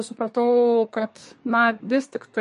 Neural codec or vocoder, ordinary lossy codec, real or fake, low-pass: codec, 24 kHz, 1 kbps, SNAC; MP3, 48 kbps; fake; 10.8 kHz